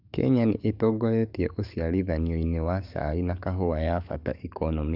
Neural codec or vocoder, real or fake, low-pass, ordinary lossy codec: codec, 44.1 kHz, 7.8 kbps, DAC; fake; 5.4 kHz; none